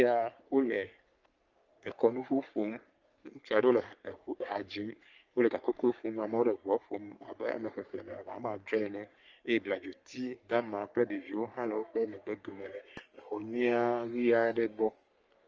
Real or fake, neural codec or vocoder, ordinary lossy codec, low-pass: fake; codec, 44.1 kHz, 3.4 kbps, Pupu-Codec; Opus, 24 kbps; 7.2 kHz